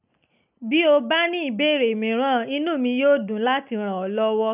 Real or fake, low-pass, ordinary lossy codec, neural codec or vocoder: real; 3.6 kHz; none; none